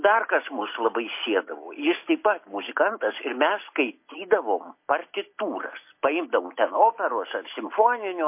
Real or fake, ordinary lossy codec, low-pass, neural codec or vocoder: real; MP3, 24 kbps; 3.6 kHz; none